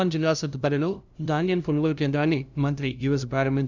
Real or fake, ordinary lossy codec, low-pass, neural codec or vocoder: fake; none; 7.2 kHz; codec, 16 kHz, 0.5 kbps, FunCodec, trained on LibriTTS, 25 frames a second